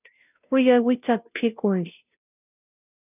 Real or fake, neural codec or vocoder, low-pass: fake; codec, 16 kHz, 0.5 kbps, FunCodec, trained on Chinese and English, 25 frames a second; 3.6 kHz